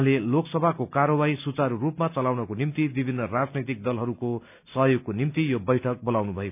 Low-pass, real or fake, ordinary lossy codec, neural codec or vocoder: 3.6 kHz; real; none; none